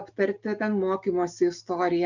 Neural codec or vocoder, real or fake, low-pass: none; real; 7.2 kHz